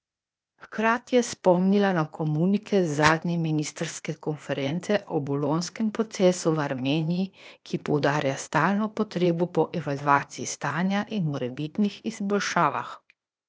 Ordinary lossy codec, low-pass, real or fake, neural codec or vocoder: none; none; fake; codec, 16 kHz, 0.8 kbps, ZipCodec